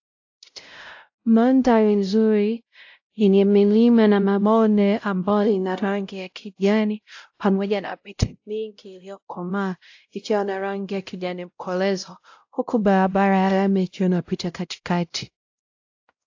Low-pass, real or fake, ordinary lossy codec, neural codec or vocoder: 7.2 kHz; fake; AAC, 48 kbps; codec, 16 kHz, 0.5 kbps, X-Codec, WavLM features, trained on Multilingual LibriSpeech